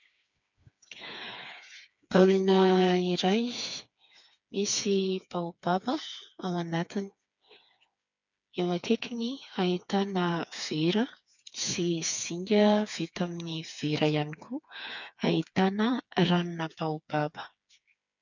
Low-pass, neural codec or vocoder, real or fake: 7.2 kHz; codec, 16 kHz, 4 kbps, FreqCodec, smaller model; fake